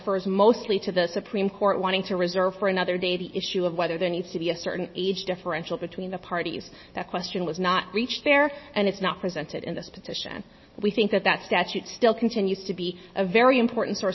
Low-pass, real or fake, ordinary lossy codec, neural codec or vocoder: 7.2 kHz; real; MP3, 24 kbps; none